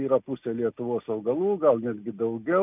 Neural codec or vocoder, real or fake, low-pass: none; real; 3.6 kHz